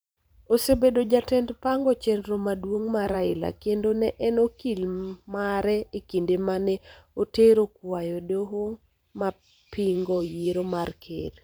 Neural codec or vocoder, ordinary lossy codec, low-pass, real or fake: vocoder, 44.1 kHz, 128 mel bands every 512 samples, BigVGAN v2; none; none; fake